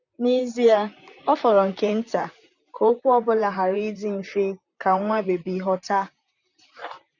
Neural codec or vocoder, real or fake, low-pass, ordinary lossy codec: vocoder, 44.1 kHz, 128 mel bands, Pupu-Vocoder; fake; 7.2 kHz; none